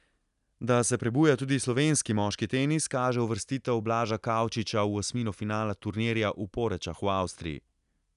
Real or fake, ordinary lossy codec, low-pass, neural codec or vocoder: real; none; 10.8 kHz; none